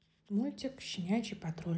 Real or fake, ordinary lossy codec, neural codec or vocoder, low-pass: real; none; none; none